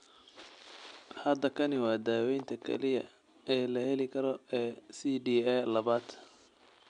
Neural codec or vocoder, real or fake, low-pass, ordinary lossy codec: none; real; 9.9 kHz; none